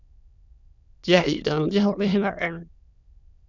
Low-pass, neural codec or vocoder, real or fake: 7.2 kHz; autoencoder, 22.05 kHz, a latent of 192 numbers a frame, VITS, trained on many speakers; fake